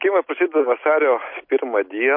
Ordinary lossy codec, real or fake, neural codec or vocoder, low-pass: MP3, 32 kbps; real; none; 5.4 kHz